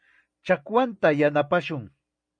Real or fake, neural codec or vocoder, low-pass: real; none; 9.9 kHz